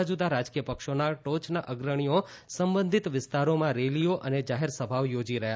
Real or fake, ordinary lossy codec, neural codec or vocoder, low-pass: real; none; none; none